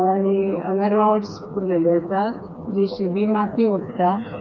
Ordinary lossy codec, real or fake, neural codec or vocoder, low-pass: none; fake; codec, 16 kHz, 2 kbps, FreqCodec, smaller model; 7.2 kHz